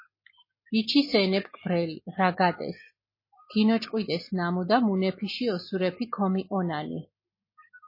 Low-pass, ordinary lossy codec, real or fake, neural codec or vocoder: 5.4 kHz; MP3, 24 kbps; real; none